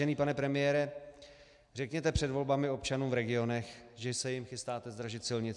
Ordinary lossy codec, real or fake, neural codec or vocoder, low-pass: MP3, 96 kbps; real; none; 10.8 kHz